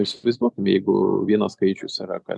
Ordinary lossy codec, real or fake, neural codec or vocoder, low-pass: MP3, 96 kbps; real; none; 10.8 kHz